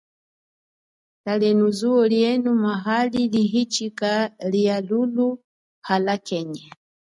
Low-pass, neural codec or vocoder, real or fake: 10.8 kHz; none; real